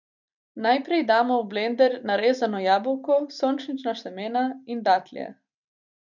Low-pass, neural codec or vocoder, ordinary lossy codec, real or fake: 7.2 kHz; none; none; real